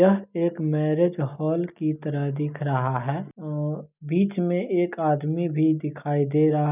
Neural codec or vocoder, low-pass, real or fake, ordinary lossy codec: none; 3.6 kHz; real; none